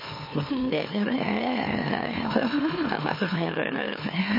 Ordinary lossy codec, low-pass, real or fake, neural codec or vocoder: MP3, 24 kbps; 5.4 kHz; fake; autoencoder, 44.1 kHz, a latent of 192 numbers a frame, MeloTTS